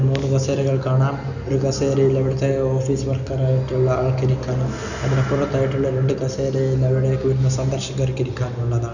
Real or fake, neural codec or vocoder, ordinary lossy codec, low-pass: real; none; none; 7.2 kHz